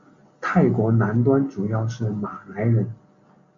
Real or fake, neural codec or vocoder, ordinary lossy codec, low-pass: real; none; MP3, 48 kbps; 7.2 kHz